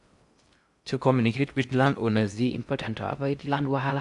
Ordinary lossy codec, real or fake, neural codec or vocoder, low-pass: AAC, 64 kbps; fake; codec, 16 kHz in and 24 kHz out, 0.6 kbps, FocalCodec, streaming, 4096 codes; 10.8 kHz